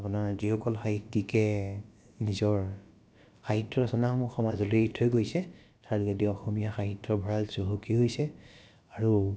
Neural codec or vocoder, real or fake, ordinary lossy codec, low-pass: codec, 16 kHz, about 1 kbps, DyCAST, with the encoder's durations; fake; none; none